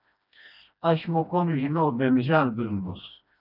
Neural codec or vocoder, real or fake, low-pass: codec, 16 kHz, 1 kbps, FreqCodec, smaller model; fake; 5.4 kHz